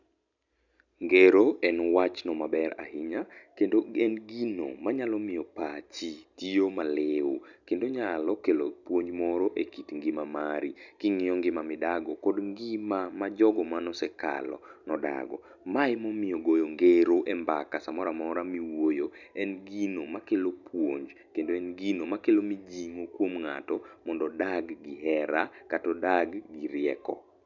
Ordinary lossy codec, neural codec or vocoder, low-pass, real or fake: none; none; 7.2 kHz; real